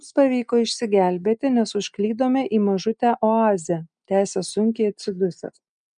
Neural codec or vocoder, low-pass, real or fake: none; 9.9 kHz; real